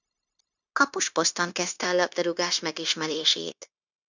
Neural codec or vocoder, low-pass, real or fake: codec, 16 kHz, 0.9 kbps, LongCat-Audio-Codec; 7.2 kHz; fake